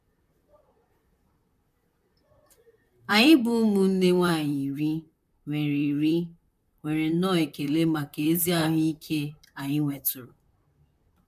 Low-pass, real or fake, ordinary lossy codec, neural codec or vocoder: 14.4 kHz; fake; none; vocoder, 44.1 kHz, 128 mel bands, Pupu-Vocoder